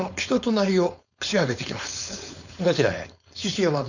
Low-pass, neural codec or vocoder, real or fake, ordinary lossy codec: 7.2 kHz; codec, 16 kHz, 4.8 kbps, FACodec; fake; none